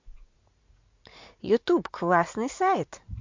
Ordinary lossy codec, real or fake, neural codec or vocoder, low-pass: MP3, 48 kbps; fake; vocoder, 44.1 kHz, 80 mel bands, Vocos; 7.2 kHz